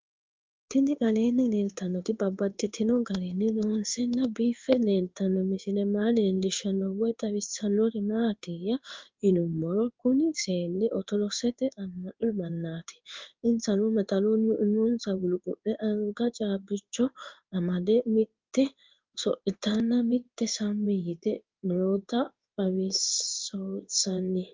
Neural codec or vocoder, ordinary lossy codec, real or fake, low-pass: codec, 16 kHz in and 24 kHz out, 1 kbps, XY-Tokenizer; Opus, 24 kbps; fake; 7.2 kHz